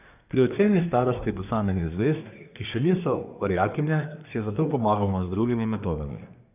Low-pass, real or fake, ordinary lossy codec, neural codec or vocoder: 3.6 kHz; fake; none; codec, 24 kHz, 1 kbps, SNAC